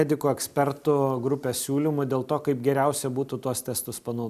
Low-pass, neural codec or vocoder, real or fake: 14.4 kHz; none; real